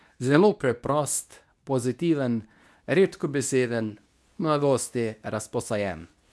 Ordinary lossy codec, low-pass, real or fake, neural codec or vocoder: none; none; fake; codec, 24 kHz, 0.9 kbps, WavTokenizer, medium speech release version 2